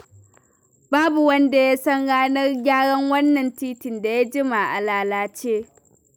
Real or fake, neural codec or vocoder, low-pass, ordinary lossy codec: real; none; none; none